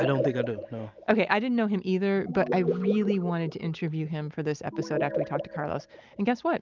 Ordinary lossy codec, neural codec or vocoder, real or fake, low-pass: Opus, 24 kbps; none; real; 7.2 kHz